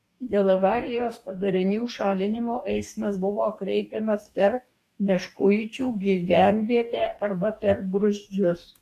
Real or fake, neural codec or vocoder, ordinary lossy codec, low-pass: fake; codec, 44.1 kHz, 2.6 kbps, DAC; AAC, 64 kbps; 14.4 kHz